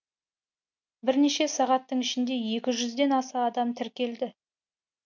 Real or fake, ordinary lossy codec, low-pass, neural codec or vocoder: real; MP3, 64 kbps; 7.2 kHz; none